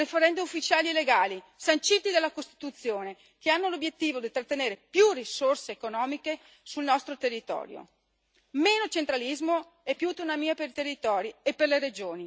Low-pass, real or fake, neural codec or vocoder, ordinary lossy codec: none; real; none; none